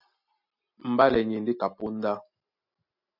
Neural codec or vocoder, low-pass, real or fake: none; 5.4 kHz; real